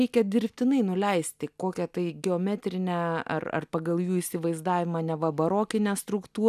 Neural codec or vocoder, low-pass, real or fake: none; 14.4 kHz; real